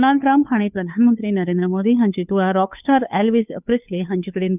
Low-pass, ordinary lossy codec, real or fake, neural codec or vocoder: 3.6 kHz; none; fake; codec, 16 kHz, 2 kbps, FunCodec, trained on Chinese and English, 25 frames a second